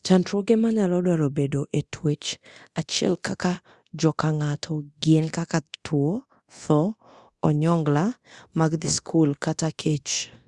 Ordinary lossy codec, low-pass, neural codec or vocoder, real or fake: Opus, 64 kbps; 10.8 kHz; codec, 24 kHz, 0.9 kbps, DualCodec; fake